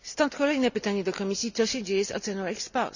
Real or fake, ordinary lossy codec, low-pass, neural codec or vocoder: real; none; 7.2 kHz; none